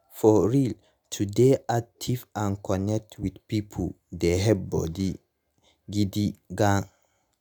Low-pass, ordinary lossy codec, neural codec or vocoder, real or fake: none; none; none; real